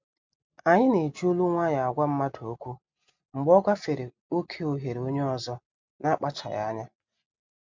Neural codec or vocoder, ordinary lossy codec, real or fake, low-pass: none; MP3, 64 kbps; real; 7.2 kHz